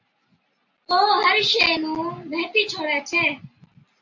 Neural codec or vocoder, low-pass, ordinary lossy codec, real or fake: none; 7.2 kHz; AAC, 48 kbps; real